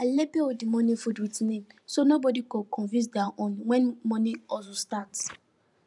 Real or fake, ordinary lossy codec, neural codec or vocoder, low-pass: fake; none; vocoder, 44.1 kHz, 128 mel bands every 512 samples, BigVGAN v2; 10.8 kHz